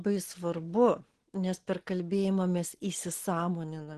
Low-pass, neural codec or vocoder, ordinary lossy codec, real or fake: 10.8 kHz; none; Opus, 16 kbps; real